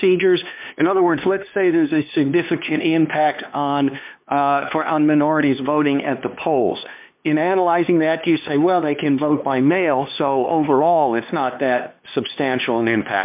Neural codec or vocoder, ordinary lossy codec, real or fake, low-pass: codec, 16 kHz, 2 kbps, X-Codec, HuBERT features, trained on LibriSpeech; MP3, 32 kbps; fake; 3.6 kHz